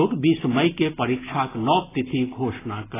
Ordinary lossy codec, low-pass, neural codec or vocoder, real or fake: AAC, 16 kbps; 3.6 kHz; none; real